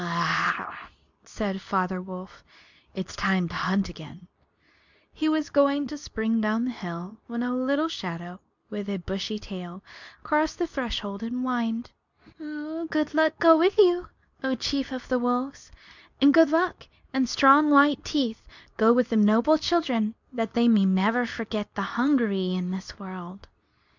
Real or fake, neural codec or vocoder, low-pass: fake; codec, 24 kHz, 0.9 kbps, WavTokenizer, medium speech release version 2; 7.2 kHz